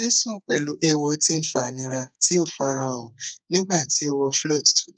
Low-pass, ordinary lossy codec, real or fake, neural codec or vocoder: 9.9 kHz; none; fake; codec, 32 kHz, 1.9 kbps, SNAC